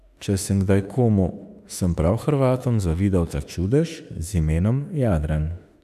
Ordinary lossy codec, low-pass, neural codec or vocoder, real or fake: none; 14.4 kHz; autoencoder, 48 kHz, 32 numbers a frame, DAC-VAE, trained on Japanese speech; fake